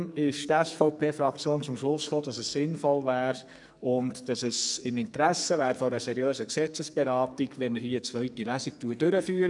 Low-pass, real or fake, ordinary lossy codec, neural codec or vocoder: 10.8 kHz; fake; none; codec, 44.1 kHz, 2.6 kbps, SNAC